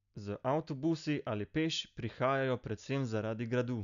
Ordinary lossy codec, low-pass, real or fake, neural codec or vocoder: MP3, 96 kbps; 7.2 kHz; real; none